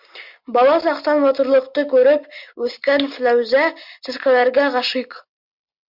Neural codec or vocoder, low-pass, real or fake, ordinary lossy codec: none; 5.4 kHz; real; MP3, 48 kbps